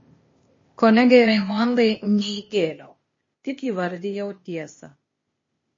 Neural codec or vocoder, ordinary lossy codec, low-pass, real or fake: codec, 16 kHz, 0.8 kbps, ZipCodec; MP3, 32 kbps; 7.2 kHz; fake